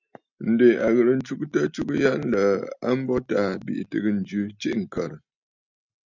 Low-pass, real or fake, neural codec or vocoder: 7.2 kHz; real; none